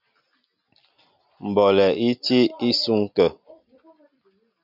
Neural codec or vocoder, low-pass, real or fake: none; 5.4 kHz; real